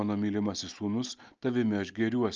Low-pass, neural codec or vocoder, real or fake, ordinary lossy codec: 7.2 kHz; none; real; Opus, 24 kbps